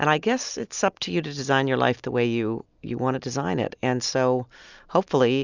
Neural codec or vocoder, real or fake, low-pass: none; real; 7.2 kHz